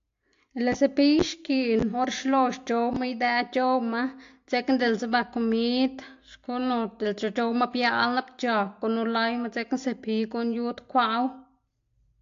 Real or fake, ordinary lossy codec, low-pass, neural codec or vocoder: real; AAC, 48 kbps; 7.2 kHz; none